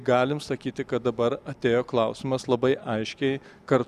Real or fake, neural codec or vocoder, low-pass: real; none; 14.4 kHz